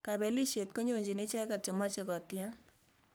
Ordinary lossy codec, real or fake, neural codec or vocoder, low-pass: none; fake; codec, 44.1 kHz, 3.4 kbps, Pupu-Codec; none